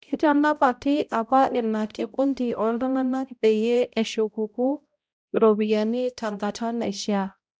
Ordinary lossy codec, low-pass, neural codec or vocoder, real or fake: none; none; codec, 16 kHz, 0.5 kbps, X-Codec, HuBERT features, trained on balanced general audio; fake